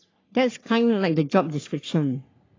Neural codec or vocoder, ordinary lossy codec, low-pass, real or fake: codec, 44.1 kHz, 3.4 kbps, Pupu-Codec; AAC, 32 kbps; 7.2 kHz; fake